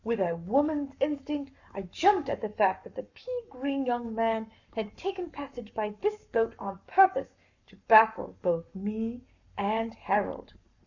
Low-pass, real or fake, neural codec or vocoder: 7.2 kHz; fake; codec, 44.1 kHz, 7.8 kbps, Pupu-Codec